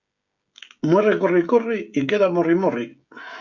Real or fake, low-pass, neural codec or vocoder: fake; 7.2 kHz; codec, 16 kHz, 16 kbps, FreqCodec, smaller model